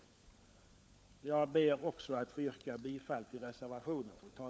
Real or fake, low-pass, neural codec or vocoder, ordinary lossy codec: fake; none; codec, 16 kHz, 16 kbps, FunCodec, trained on LibriTTS, 50 frames a second; none